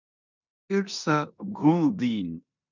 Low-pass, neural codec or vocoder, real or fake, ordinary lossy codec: 7.2 kHz; codec, 16 kHz in and 24 kHz out, 0.9 kbps, LongCat-Audio-Codec, four codebook decoder; fake; MP3, 64 kbps